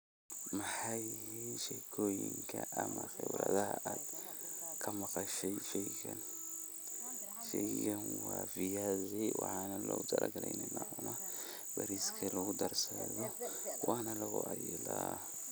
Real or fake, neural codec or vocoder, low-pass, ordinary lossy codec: real; none; none; none